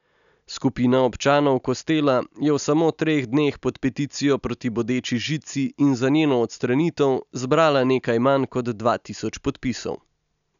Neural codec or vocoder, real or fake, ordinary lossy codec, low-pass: none; real; none; 7.2 kHz